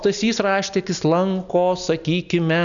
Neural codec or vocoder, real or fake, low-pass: none; real; 7.2 kHz